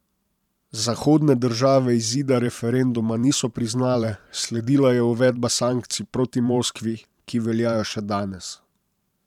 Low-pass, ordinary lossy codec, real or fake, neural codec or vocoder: 19.8 kHz; none; fake; vocoder, 44.1 kHz, 128 mel bands every 512 samples, BigVGAN v2